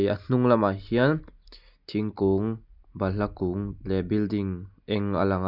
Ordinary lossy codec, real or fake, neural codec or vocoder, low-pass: none; real; none; 5.4 kHz